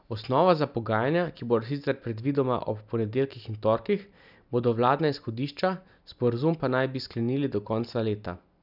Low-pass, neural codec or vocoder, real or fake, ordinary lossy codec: 5.4 kHz; none; real; none